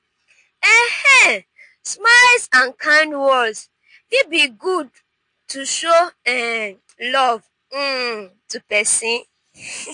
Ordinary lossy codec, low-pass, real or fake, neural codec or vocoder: MP3, 48 kbps; 9.9 kHz; real; none